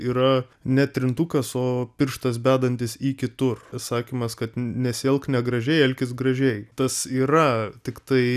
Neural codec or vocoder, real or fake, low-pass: none; real; 14.4 kHz